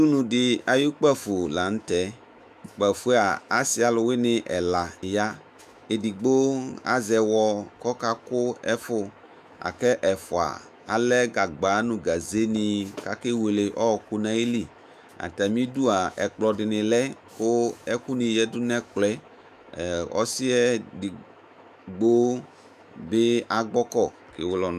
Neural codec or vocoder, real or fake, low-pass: autoencoder, 48 kHz, 128 numbers a frame, DAC-VAE, trained on Japanese speech; fake; 14.4 kHz